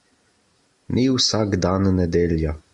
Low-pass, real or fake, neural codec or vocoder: 10.8 kHz; real; none